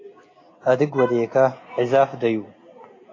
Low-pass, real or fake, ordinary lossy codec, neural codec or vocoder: 7.2 kHz; real; AAC, 32 kbps; none